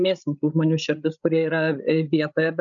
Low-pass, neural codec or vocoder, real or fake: 7.2 kHz; codec, 16 kHz, 16 kbps, FreqCodec, larger model; fake